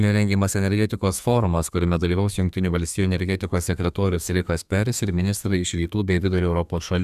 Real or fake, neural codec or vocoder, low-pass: fake; codec, 32 kHz, 1.9 kbps, SNAC; 14.4 kHz